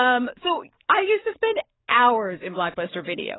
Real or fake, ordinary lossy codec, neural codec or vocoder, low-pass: fake; AAC, 16 kbps; codec, 44.1 kHz, 7.8 kbps, DAC; 7.2 kHz